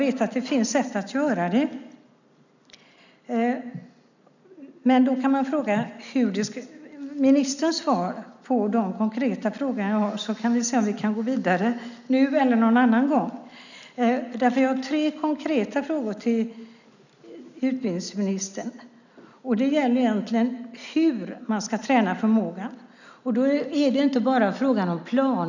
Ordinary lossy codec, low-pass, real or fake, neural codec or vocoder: none; 7.2 kHz; real; none